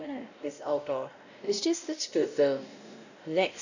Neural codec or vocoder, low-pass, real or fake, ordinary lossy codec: codec, 16 kHz, 0.5 kbps, X-Codec, WavLM features, trained on Multilingual LibriSpeech; 7.2 kHz; fake; none